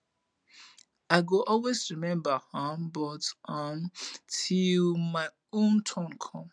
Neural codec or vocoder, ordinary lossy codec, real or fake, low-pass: none; none; real; 9.9 kHz